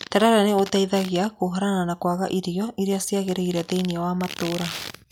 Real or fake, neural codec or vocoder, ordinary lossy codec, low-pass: real; none; none; none